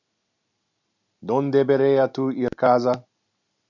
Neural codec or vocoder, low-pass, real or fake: none; 7.2 kHz; real